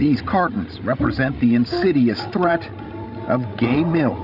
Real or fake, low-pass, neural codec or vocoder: fake; 5.4 kHz; codec, 16 kHz, 16 kbps, FreqCodec, larger model